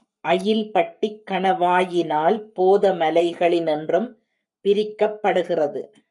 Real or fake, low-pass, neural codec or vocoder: fake; 10.8 kHz; codec, 44.1 kHz, 7.8 kbps, Pupu-Codec